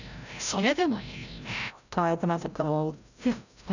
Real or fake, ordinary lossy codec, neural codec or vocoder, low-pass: fake; none; codec, 16 kHz, 0.5 kbps, FreqCodec, larger model; 7.2 kHz